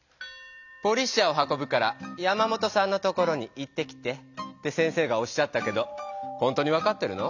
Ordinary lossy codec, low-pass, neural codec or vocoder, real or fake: none; 7.2 kHz; none; real